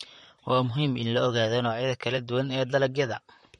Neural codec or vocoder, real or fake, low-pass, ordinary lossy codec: vocoder, 44.1 kHz, 128 mel bands, Pupu-Vocoder; fake; 19.8 kHz; MP3, 48 kbps